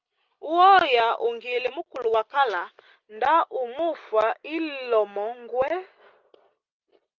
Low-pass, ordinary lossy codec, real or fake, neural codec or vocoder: 7.2 kHz; Opus, 24 kbps; real; none